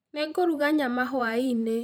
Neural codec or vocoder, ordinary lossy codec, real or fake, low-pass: vocoder, 44.1 kHz, 128 mel bands every 512 samples, BigVGAN v2; none; fake; none